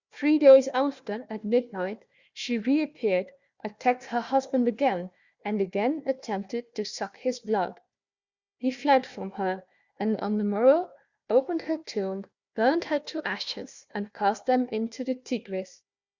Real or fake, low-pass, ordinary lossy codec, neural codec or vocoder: fake; 7.2 kHz; Opus, 64 kbps; codec, 16 kHz, 1 kbps, FunCodec, trained on Chinese and English, 50 frames a second